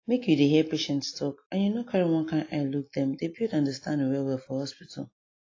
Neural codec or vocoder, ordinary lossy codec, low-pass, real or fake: none; AAC, 32 kbps; 7.2 kHz; real